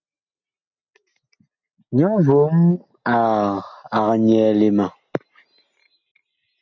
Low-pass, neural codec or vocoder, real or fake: 7.2 kHz; none; real